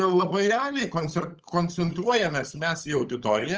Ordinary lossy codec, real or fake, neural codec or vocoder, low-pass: Opus, 24 kbps; fake; codec, 16 kHz, 16 kbps, FunCodec, trained on LibriTTS, 50 frames a second; 7.2 kHz